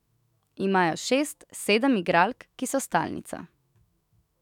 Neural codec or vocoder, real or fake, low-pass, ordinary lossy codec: autoencoder, 48 kHz, 128 numbers a frame, DAC-VAE, trained on Japanese speech; fake; 19.8 kHz; none